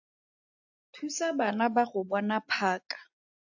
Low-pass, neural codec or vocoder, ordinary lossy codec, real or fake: 7.2 kHz; none; AAC, 48 kbps; real